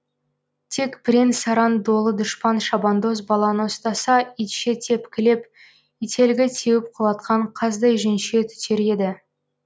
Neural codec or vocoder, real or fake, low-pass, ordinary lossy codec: none; real; none; none